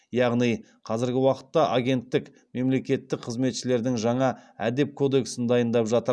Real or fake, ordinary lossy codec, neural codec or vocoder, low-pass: real; none; none; none